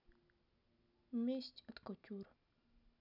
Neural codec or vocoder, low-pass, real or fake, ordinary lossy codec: none; 5.4 kHz; real; none